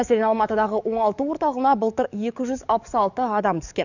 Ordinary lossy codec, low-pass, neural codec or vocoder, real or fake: none; 7.2 kHz; codec, 44.1 kHz, 7.8 kbps, DAC; fake